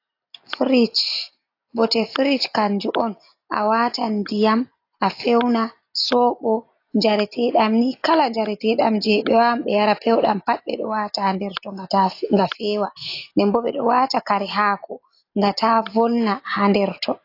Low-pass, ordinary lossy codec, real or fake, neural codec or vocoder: 5.4 kHz; AAC, 32 kbps; real; none